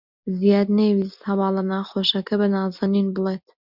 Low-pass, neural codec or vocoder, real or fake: 5.4 kHz; none; real